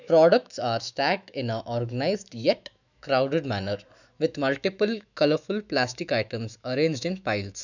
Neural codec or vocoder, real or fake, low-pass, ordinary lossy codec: autoencoder, 48 kHz, 128 numbers a frame, DAC-VAE, trained on Japanese speech; fake; 7.2 kHz; none